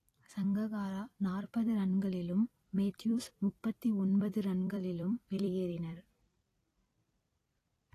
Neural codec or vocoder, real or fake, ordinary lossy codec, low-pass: vocoder, 44.1 kHz, 128 mel bands every 256 samples, BigVGAN v2; fake; AAC, 48 kbps; 14.4 kHz